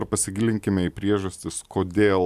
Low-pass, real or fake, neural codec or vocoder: 14.4 kHz; real; none